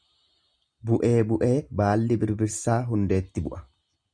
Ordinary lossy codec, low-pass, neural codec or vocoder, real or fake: Opus, 64 kbps; 9.9 kHz; none; real